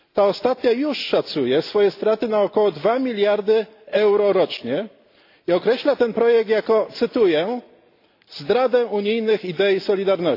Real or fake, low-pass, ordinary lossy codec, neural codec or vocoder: real; 5.4 kHz; AAC, 32 kbps; none